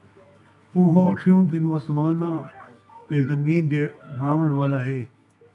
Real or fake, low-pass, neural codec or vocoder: fake; 10.8 kHz; codec, 24 kHz, 0.9 kbps, WavTokenizer, medium music audio release